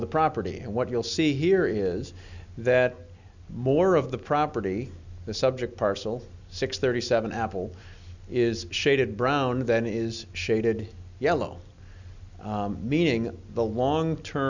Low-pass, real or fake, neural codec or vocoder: 7.2 kHz; real; none